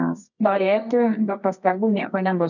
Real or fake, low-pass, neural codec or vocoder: fake; 7.2 kHz; codec, 24 kHz, 0.9 kbps, WavTokenizer, medium music audio release